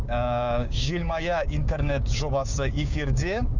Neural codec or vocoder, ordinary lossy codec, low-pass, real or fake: codec, 16 kHz, 6 kbps, DAC; none; 7.2 kHz; fake